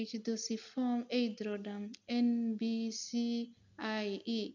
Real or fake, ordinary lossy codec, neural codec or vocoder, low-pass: real; none; none; 7.2 kHz